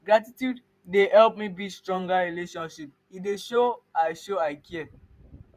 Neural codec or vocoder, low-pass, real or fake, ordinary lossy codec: vocoder, 44.1 kHz, 128 mel bands every 512 samples, BigVGAN v2; 14.4 kHz; fake; none